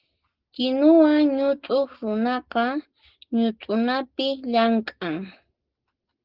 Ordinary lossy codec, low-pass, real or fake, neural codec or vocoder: Opus, 16 kbps; 5.4 kHz; real; none